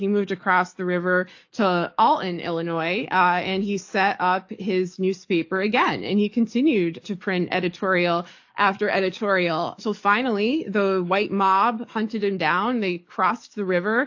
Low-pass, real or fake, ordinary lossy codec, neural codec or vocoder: 7.2 kHz; fake; AAC, 48 kbps; codec, 44.1 kHz, 7.8 kbps, DAC